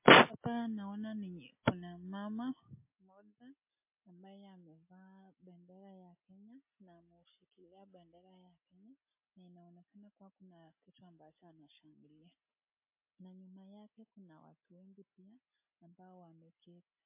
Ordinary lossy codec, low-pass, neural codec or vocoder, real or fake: MP3, 24 kbps; 3.6 kHz; none; real